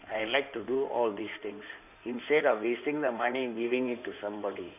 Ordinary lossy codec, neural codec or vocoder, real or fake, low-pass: none; codec, 16 kHz in and 24 kHz out, 2.2 kbps, FireRedTTS-2 codec; fake; 3.6 kHz